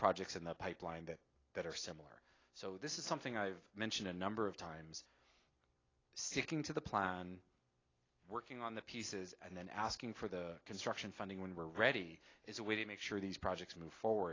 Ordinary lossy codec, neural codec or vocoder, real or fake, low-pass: AAC, 32 kbps; none; real; 7.2 kHz